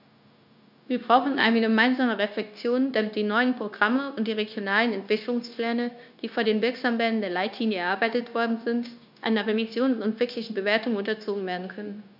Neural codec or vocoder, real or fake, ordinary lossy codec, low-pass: codec, 16 kHz, 0.9 kbps, LongCat-Audio-Codec; fake; none; 5.4 kHz